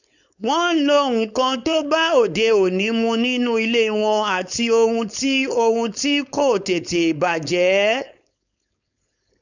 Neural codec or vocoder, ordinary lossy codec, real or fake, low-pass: codec, 16 kHz, 4.8 kbps, FACodec; none; fake; 7.2 kHz